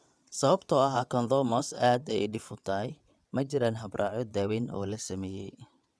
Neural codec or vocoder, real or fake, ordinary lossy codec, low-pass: vocoder, 22.05 kHz, 80 mel bands, WaveNeXt; fake; none; none